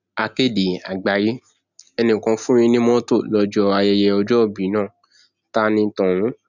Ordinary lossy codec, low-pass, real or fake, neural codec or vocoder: none; 7.2 kHz; real; none